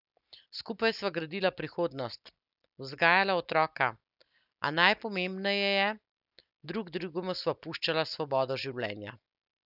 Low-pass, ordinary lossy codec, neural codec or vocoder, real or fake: 5.4 kHz; none; none; real